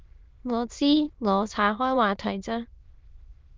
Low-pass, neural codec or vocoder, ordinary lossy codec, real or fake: 7.2 kHz; autoencoder, 22.05 kHz, a latent of 192 numbers a frame, VITS, trained on many speakers; Opus, 24 kbps; fake